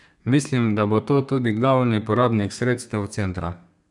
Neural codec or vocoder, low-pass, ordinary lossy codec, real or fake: codec, 32 kHz, 1.9 kbps, SNAC; 10.8 kHz; none; fake